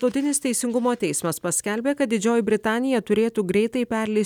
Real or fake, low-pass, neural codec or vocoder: real; 19.8 kHz; none